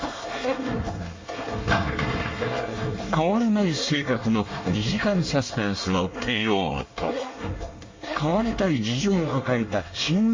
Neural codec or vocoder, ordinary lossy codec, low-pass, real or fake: codec, 24 kHz, 1 kbps, SNAC; MP3, 32 kbps; 7.2 kHz; fake